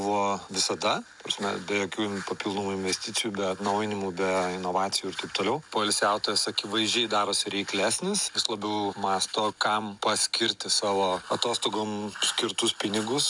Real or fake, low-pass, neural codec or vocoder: real; 10.8 kHz; none